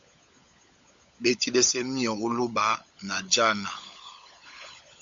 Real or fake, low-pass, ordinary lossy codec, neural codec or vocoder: fake; 7.2 kHz; Opus, 64 kbps; codec, 16 kHz, 16 kbps, FunCodec, trained on LibriTTS, 50 frames a second